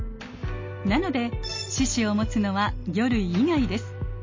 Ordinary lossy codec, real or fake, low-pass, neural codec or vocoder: MP3, 32 kbps; real; 7.2 kHz; none